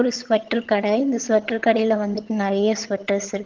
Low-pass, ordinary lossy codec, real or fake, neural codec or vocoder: 7.2 kHz; Opus, 16 kbps; fake; vocoder, 22.05 kHz, 80 mel bands, HiFi-GAN